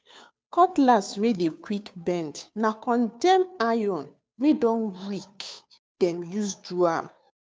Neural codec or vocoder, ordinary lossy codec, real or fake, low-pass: codec, 16 kHz, 2 kbps, FunCodec, trained on Chinese and English, 25 frames a second; none; fake; none